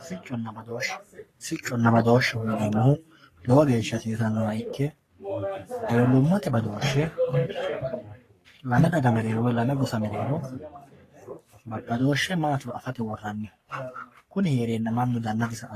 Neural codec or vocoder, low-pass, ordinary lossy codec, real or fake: codec, 44.1 kHz, 3.4 kbps, Pupu-Codec; 14.4 kHz; AAC, 48 kbps; fake